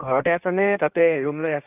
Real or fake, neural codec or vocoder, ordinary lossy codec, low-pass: fake; codec, 16 kHz in and 24 kHz out, 1.1 kbps, FireRedTTS-2 codec; AAC, 24 kbps; 3.6 kHz